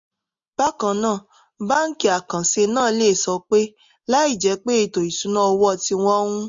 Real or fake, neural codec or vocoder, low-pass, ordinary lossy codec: real; none; 7.2 kHz; MP3, 48 kbps